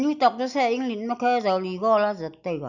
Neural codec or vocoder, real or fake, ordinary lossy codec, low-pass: none; real; none; 7.2 kHz